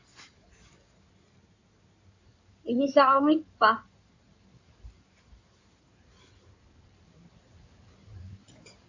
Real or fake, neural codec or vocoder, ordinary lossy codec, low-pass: fake; codec, 16 kHz in and 24 kHz out, 2.2 kbps, FireRedTTS-2 codec; AAC, 48 kbps; 7.2 kHz